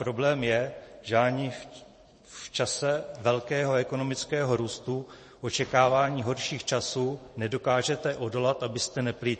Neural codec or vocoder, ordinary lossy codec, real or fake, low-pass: vocoder, 44.1 kHz, 128 mel bands every 512 samples, BigVGAN v2; MP3, 32 kbps; fake; 10.8 kHz